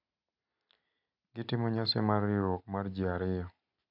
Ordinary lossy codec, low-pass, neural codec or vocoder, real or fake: none; 5.4 kHz; none; real